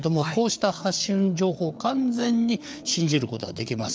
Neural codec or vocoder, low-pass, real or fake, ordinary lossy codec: codec, 16 kHz, 4 kbps, FreqCodec, larger model; none; fake; none